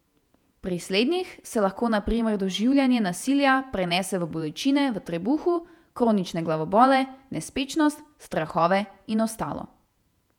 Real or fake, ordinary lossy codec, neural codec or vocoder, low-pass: fake; none; vocoder, 48 kHz, 128 mel bands, Vocos; 19.8 kHz